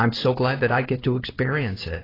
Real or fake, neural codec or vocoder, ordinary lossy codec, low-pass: fake; codec, 16 kHz in and 24 kHz out, 1 kbps, XY-Tokenizer; AAC, 24 kbps; 5.4 kHz